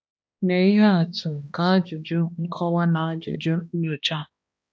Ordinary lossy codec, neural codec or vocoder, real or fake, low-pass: none; codec, 16 kHz, 1 kbps, X-Codec, HuBERT features, trained on balanced general audio; fake; none